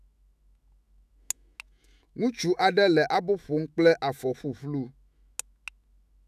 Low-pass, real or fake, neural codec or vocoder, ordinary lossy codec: 14.4 kHz; fake; autoencoder, 48 kHz, 128 numbers a frame, DAC-VAE, trained on Japanese speech; none